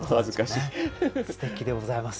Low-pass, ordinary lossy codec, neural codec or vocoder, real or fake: none; none; none; real